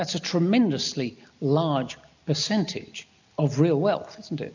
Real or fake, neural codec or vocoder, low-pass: real; none; 7.2 kHz